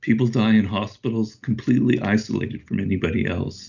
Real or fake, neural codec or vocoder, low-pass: real; none; 7.2 kHz